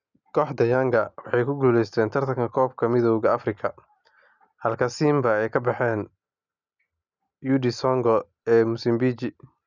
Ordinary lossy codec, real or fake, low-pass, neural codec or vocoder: none; real; 7.2 kHz; none